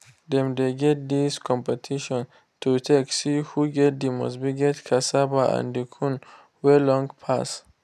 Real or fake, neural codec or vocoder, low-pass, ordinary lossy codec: real; none; 14.4 kHz; none